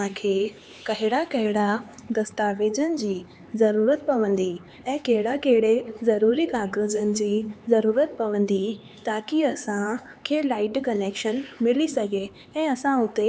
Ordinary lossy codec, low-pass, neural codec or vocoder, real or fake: none; none; codec, 16 kHz, 4 kbps, X-Codec, HuBERT features, trained on LibriSpeech; fake